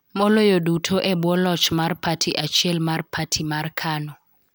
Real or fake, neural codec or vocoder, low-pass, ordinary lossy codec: real; none; none; none